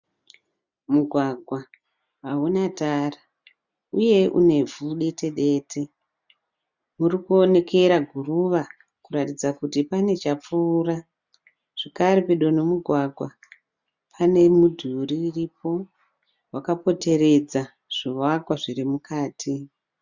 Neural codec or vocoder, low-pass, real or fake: none; 7.2 kHz; real